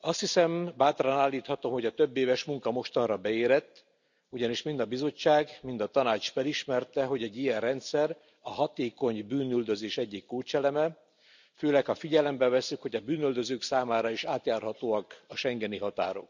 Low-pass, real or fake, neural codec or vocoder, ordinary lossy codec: 7.2 kHz; real; none; none